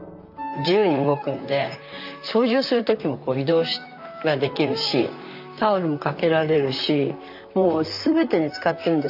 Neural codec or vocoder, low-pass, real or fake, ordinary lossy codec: vocoder, 44.1 kHz, 128 mel bands, Pupu-Vocoder; 5.4 kHz; fake; none